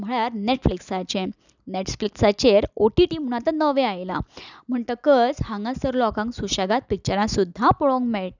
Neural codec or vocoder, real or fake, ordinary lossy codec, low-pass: none; real; none; 7.2 kHz